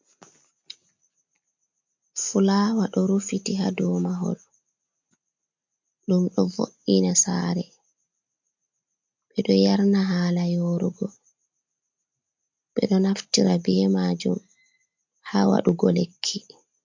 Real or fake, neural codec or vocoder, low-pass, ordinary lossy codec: real; none; 7.2 kHz; MP3, 48 kbps